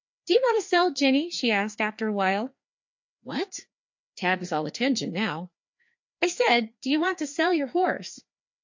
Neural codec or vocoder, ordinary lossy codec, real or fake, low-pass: codec, 16 kHz, 2 kbps, FreqCodec, larger model; MP3, 48 kbps; fake; 7.2 kHz